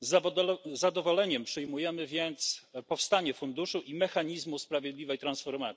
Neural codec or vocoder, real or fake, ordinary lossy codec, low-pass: none; real; none; none